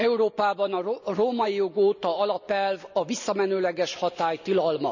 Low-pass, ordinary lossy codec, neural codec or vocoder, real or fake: 7.2 kHz; none; none; real